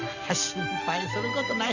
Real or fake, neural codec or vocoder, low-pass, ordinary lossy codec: real; none; 7.2 kHz; Opus, 64 kbps